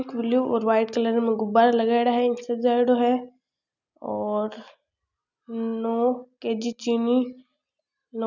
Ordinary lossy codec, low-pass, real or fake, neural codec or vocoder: none; 7.2 kHz; real; none